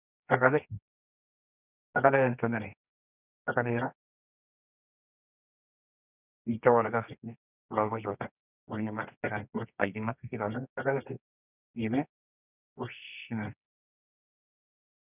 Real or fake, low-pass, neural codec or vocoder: fake; 3.6 kHz; codec, 24 kHz, 0.9 kbps, WavTokenizer, medium music audio release